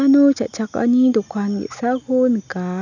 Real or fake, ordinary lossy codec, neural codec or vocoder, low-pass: real; none; none; 7.2 kHz